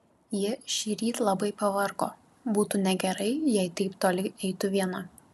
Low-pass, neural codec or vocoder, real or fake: 14.4 kHz; none; real